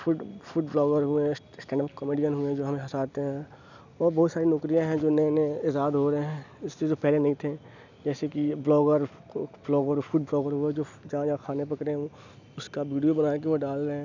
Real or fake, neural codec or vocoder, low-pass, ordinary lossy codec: real; none; 7.2 kHz; none